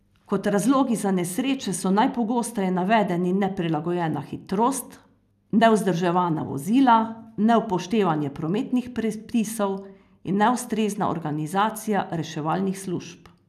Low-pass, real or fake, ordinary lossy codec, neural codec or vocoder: 14.4 kHz; real; none; none